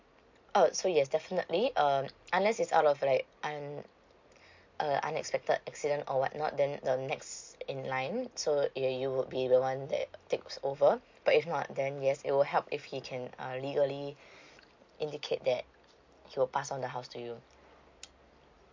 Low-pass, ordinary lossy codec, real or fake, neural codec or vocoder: 7.2 kHz; MP3, 48 kbps; real; none